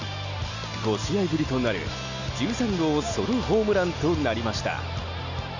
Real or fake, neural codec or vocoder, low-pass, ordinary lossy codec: real; none; 7.2 kHz; Opus, 64 kbps